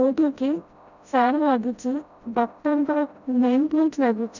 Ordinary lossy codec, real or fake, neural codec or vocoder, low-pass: none; fake; codec, 16 kHz, 0.5 kbps, FreqCodec, smaller model; 7.2 kHz